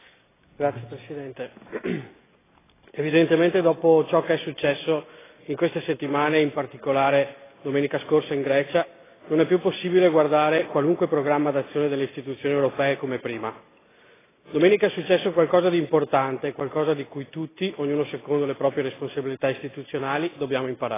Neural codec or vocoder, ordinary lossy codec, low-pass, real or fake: none; AAC, 16 kbps; 3.6 kHz; real